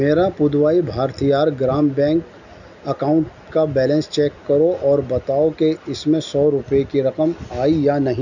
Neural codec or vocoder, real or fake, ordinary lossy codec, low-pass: none; real; none; 7.2 kHz